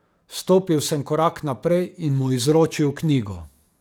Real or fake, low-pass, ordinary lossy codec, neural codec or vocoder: fake; none; none; codec, 44.1 kHz, 7.8 kbps, DAC